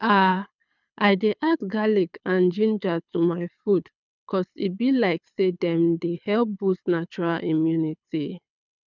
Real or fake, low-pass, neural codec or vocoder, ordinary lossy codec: fake; 7.2 kHz; codec, 24 kHz, 6 kbps, HILCodec; none